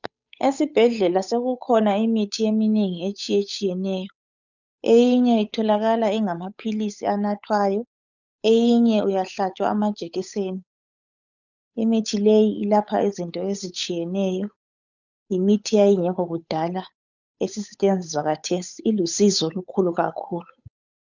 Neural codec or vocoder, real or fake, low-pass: codec, 16 kHz, 8 kbps, FunCodec, trained on Chinese and English, 25 frames a second; fake; 7.2 kHz